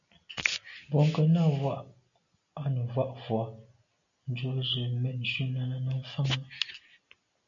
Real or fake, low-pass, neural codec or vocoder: real; 7.2 kHz; none